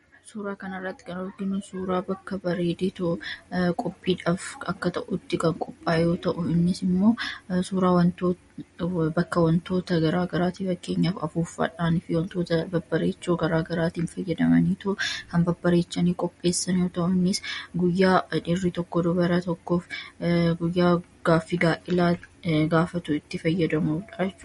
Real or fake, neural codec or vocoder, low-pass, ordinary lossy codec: real; none; 19.8 kHz; MP3, 48 kbps